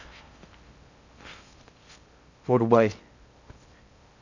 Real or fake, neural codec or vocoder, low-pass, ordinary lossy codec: fake; codec, 16 kHz in and 24 kHz out, 0.6 kbps, FocalCodec, streaming, 4096 codes; 7.2 kHz; Opus, 64 kbps